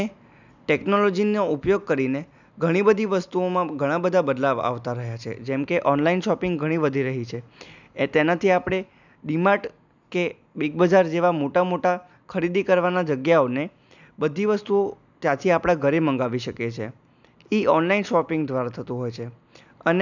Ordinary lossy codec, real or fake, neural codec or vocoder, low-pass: none; real; none; 7.2 kHz